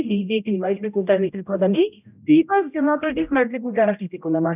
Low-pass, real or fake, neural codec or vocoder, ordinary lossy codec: 3.6 kHz; fake; codec, 16 kHz, 0.5 kbps, X-Codec, HuBERT features, trained on general audio; none